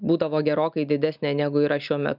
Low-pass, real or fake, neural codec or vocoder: 5.4 kHz; real; none